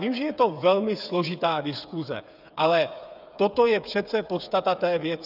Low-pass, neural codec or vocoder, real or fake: 5.4 kHz; codec, 16 kHz, 8 kbps, FreqCodec, smaller model; fake